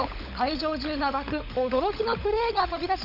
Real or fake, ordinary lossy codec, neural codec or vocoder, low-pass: fake; none; codec, 16 kHz, 16 kbps, FunCodec, trained on LibriTTS, 50 frames a second; 5.4 kHz